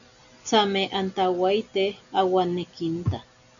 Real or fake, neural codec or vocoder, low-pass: real; none; 7.2 kHz